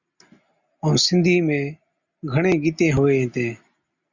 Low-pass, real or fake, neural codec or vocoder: 7.2 kHz; real; none